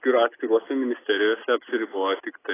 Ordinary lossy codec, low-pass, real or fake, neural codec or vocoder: AAC, 16 kbps; 3.6 kHz; real; none